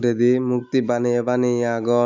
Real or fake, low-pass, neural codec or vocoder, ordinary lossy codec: real; 7.2 kHz; none; none